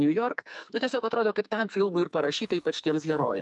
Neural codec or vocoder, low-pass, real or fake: codec, 44.1 kHz, 2.6 kbps, SNAC; 10.8 kHz; fake